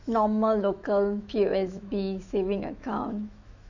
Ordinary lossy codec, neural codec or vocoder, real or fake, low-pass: none; autoencoder, 48 kHz, 128 numbers a frame, DAC-VAE, trained on Japanese speech; fake; 7.2 kHz